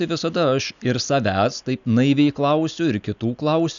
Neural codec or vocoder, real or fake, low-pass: none; real; 7.2 kHz